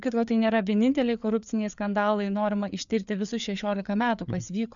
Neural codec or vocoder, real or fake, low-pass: codec, 16 kHz, 4 kbps, FreqCodec, larger model; fake; 7.2 kHz